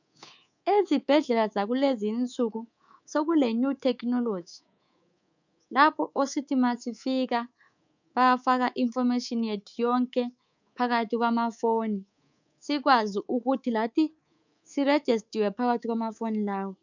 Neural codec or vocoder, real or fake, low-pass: codec, 24 kHz, 3.1 kbps, DualCodec; fake; 7.2 kHz